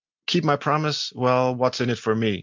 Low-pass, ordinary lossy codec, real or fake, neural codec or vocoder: 7.2 kHz; MP3, 64 kbps; real; none